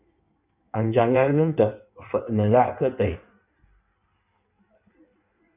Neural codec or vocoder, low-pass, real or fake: codec, 16 kHz in and 24 kHz out, 1.1 kbps, FireRedTTS-2 codec; 3.6 kHz; fake